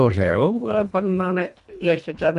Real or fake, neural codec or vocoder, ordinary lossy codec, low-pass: fake; codec, 24 kHz, 1.5 kbps, HILCodec; none; 10.8 kHz